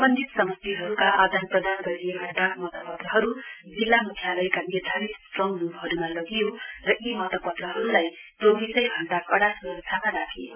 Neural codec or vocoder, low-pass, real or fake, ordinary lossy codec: none; 3.6 kHz; real; none